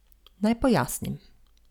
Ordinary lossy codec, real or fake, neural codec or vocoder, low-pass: none; real; none; 19.8 kHz